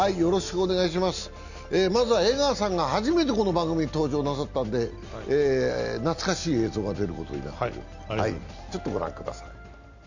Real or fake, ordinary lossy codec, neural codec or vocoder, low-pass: real; none; none; 7.2 kHz